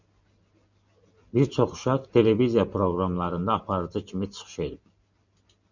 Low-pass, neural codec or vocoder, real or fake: 7.2 kHz; none; real